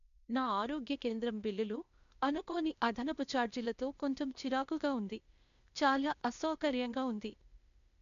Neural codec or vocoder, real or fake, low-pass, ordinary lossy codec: codec, 16 kHz, 0.8 kbps, ZipCodec; fake; 7.2 kHz; MP3, 96 kbps